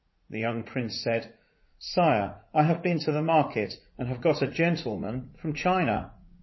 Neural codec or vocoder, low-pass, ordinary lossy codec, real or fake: vocoder, 44.1 kHz, 80 mel bands, Vocos; 7.2 kHz; MP3, 24 kbps; fake